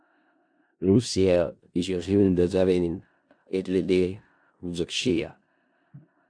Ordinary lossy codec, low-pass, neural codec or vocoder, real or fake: AAC, 64 kbps; 9.9 kHz; codec, 16 kHz in and 24 kHz out, 0.4 kbps, LongCat-Audio-Codec, four codebook decoder; fake